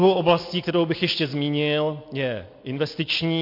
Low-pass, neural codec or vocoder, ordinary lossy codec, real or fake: 5.4 kHz; none; MP3, 32 kbps; real